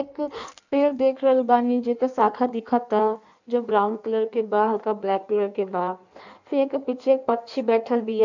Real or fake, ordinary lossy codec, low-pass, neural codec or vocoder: fake; none; 7.2 kHz; codec, 16 kHz in and 24 kHz out, 1.1 kbps, FireRedTTS-2 codec